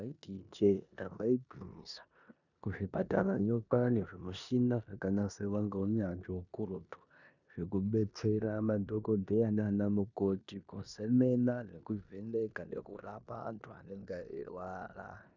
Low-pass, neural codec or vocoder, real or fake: 7.2 kHz; codec, 16 kHz in and 24 kHz out, 0.9 kbps, LongCat-Audio-Codec, four codebook decoder; fake